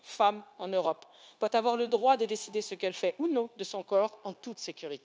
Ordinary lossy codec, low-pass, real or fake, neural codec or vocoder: none; none; fake; codec, 16 kHz, 0.9 kbps, LongCat-Audio-Codec